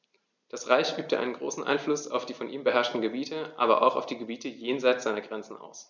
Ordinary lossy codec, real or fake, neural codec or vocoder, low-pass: none; real; none; 7.2 kHz